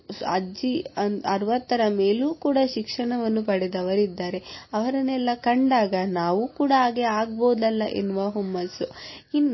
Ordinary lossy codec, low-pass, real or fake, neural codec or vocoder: MP3, 24 kbps; 7.2 kHz; real; none